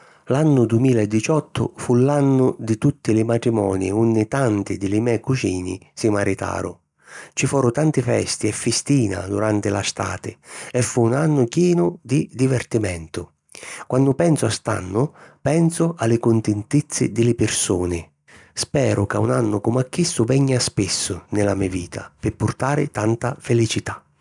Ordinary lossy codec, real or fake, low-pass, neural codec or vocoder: none; real; 10.8 kHz; none